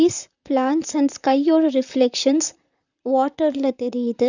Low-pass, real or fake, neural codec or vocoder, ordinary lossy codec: 7.2 kHz; fake; vocoder, 44.1 kHz, 128 mel bands, Pupu-Vocoder; none